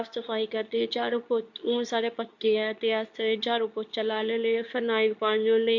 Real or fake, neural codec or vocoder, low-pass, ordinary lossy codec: fake; codec, 24 kHz, 0.9 kbps, WavTokenizer, medium speech release version 2; 7.2 kHz; MP3, 64 kbps